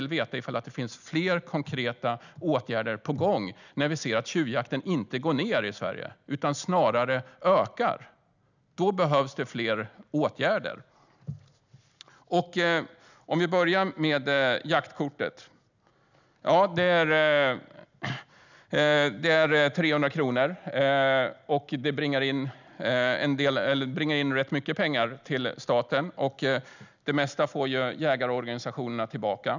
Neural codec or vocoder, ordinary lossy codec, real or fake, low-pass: none; none; real; 7.2 kHz